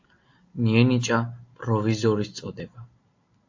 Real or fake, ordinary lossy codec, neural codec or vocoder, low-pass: real; AAC, 48 kbps; none; 7.2 kHz